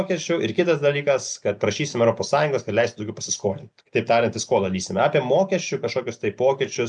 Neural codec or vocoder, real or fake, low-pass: none; real; 9.9 kHz